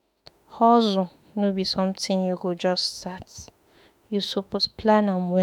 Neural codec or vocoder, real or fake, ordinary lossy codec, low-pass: autoencoder, 48 kHz, 32 numbers a frame, DAC-VAE, trained on Japanese speech; fake; none; 19.8 kHz